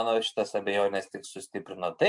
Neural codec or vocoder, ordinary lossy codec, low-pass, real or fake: none; MP3, 64 kbps; 10.8 kHz; real